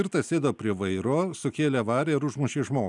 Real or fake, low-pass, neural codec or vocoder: real; 10.8 kHz; none